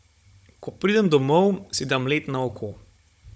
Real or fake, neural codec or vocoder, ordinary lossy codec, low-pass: fake; codec, 16 kHz, 16 kbps, FunCodec, trained on Chinese and English, 50 frames a second; none; none